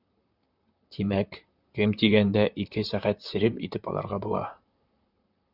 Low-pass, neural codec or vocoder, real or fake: 5.4 kHz; vocoder, 44.1 kHz, 128 mel bands, Pupu-Vocoder; fake